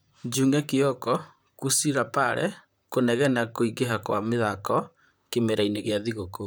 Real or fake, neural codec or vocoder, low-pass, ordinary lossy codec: fake; vocoder, 44.1 kHz, 128 mel bands, Pupu-Vocoder; none; none